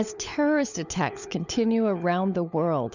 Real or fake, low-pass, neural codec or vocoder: fake; 7.2 kHz; codec, 16 kHz, 16 kbps, FunCodec, trained on LibriTTS, 50 frames a second